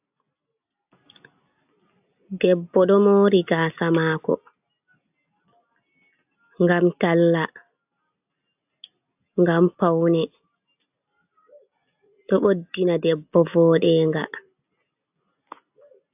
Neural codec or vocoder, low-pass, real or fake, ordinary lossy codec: none; 3.6 kHz; real; AAC, 32 kbps